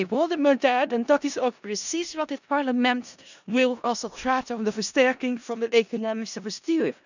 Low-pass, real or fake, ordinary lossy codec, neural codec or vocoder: 7.2 kHz; fake; none; codec, 16 kHz in and 24 kHz out, 0.4 kbps, LongCat-Audio-Codec, four codebook decoder